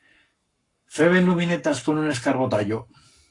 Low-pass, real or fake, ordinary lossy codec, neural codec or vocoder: 10.8 kHz; fake; AAC, 48 kbps; codec, 44.1 kHz, 7.8 kbps, Pupu-Codec